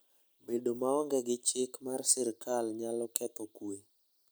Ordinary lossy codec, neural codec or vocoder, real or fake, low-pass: none; none; real; none